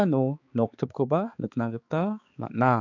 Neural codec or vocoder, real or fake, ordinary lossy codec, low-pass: codec, 16 kHz, 4 kbps, X-Codec, HuBERT features, trained on balanced general audio; fake; AAC, 48 kbps; 7.2 kHz